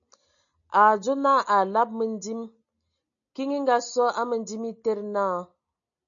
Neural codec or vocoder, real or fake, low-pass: none; real; 7.2 kHz